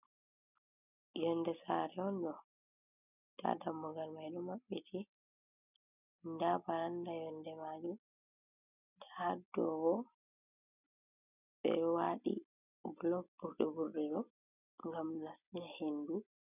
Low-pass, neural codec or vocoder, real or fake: 3.6 kHz; none; real